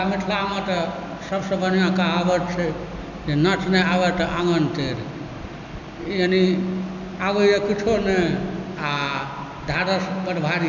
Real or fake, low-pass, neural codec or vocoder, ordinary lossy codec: real; 7.2 kHz; none; none